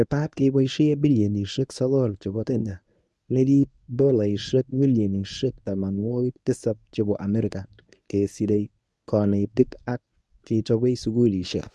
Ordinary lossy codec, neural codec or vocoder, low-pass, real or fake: none; codec, 24 kHz, 0.9 kbps, WavTokenizer, medium speech release version 1; none; fake